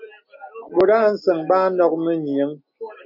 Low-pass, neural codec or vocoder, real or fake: 5.4 kHz; none; real